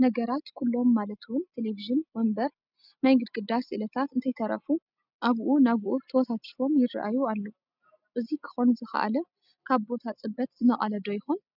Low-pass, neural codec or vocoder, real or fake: 5.4 kHz; none; real